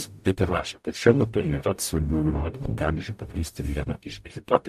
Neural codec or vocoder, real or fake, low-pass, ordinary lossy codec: codec, 44.1 kHz, 0.9 kbps, DAC; fake; 14.4 kHz; MP3, 96 kbps